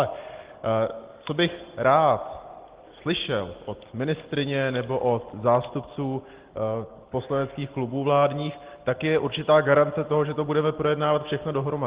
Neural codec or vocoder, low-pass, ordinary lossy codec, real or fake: none; 3.6 kHz; Opus, 16 kbps; real